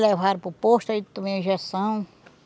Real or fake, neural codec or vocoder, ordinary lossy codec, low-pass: real; none; none; none